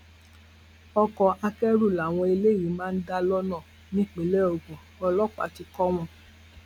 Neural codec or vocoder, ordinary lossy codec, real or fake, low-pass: none; none; real; 19.8 kHz